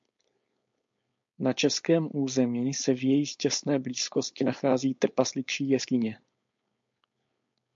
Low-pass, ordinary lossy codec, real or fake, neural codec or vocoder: 7.2 kHz; MP3, 48 kbps; fake; codec, 16 kHz, 4.8 kbps, FACodec